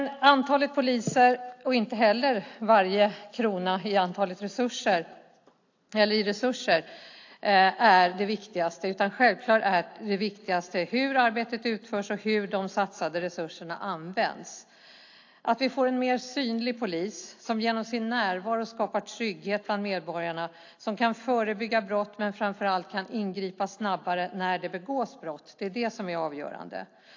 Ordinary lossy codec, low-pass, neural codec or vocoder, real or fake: AAC, 48 kbps; 7.2 kHz; none; real